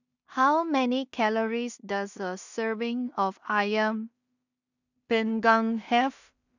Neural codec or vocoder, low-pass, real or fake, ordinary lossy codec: codec, 16 kHz in and 24 kHz out, 0.4 kbps, LongCat-Audio-Codec, two codebook decoder; 7.2 kHz; fake; none